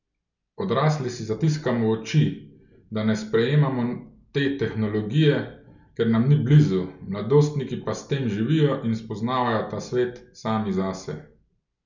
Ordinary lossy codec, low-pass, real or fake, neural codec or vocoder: none; 7.2 kHz; real; none